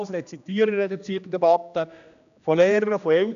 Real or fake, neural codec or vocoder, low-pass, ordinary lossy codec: fake; codec, 16 kHz, 1 kbps, X-Codec, HuBERT features, trained on general audio; 7.2 kHz; none